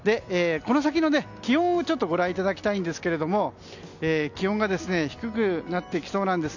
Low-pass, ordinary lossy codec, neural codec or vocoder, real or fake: 7.2 kHz; none; none; real